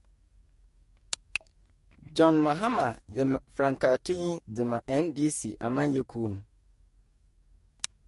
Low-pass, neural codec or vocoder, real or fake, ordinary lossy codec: 14.4 kHz; codec, 44.1 kHz, 2.6 kbps, DAC; fake; MP3, 48 kbps